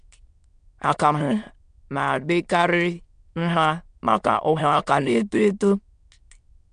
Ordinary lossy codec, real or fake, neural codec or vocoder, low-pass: MP3, 64 kbps; fake; autoencoder, 22.05 kHz, a latent of 192 numbers a frame, VITS, trained on many speakers; 9.9 kHz